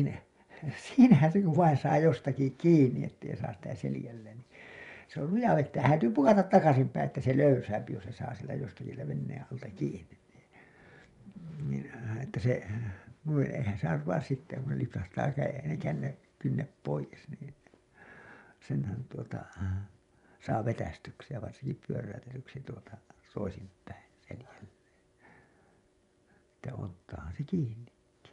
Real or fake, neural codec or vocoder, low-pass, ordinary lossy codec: fake; vocoder, 24 kHz, 100 mel bands, Vocos; 10.8 kHz; none